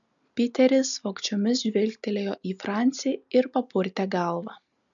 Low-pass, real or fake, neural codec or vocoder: 7.2 kHz; real; none